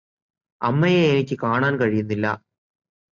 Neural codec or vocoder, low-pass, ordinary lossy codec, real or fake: none; 7.2 kHz; Opus, 64 kbps; real